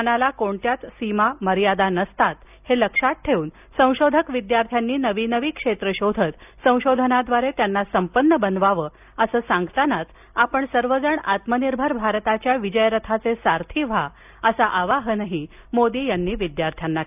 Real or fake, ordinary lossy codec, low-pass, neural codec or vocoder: real; none; 3.6 kHz; none